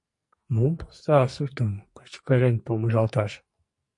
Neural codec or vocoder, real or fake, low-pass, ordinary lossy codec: codec, 44.1 kHz, 2.6 kbps, SNAC; fake; 10.8 kHz; MP3, 48 kbps